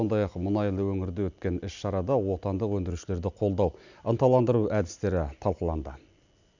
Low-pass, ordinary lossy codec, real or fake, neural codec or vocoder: 7.2 kHz; none; real; none